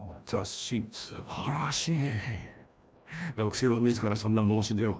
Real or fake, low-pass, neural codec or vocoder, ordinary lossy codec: fake; none; codec, 16 kHz, 1 kbps, FreqCodec, larger model; none